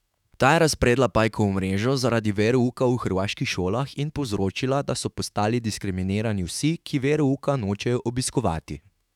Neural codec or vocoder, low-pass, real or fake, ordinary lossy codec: autoencoder, 48 kHz, 128 numbers a frame, DAC-VAE, trained on Japanese speech; 19.8 kHz; fake; none